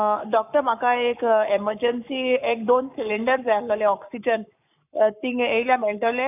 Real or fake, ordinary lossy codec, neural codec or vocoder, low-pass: fake; none; codec, 44.1 kHz, 7.8 kbps, Pupu-Codec; 3.6 kHz